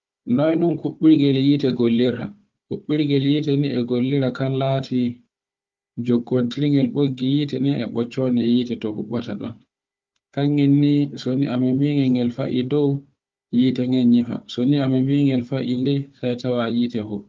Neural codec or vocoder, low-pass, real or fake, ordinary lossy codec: codec, 16 kHz, 4 kbps, FunCodec, trained on Chinese and English, 50 frames a second; 7.2 kHz; fake; Opus, 32 kbps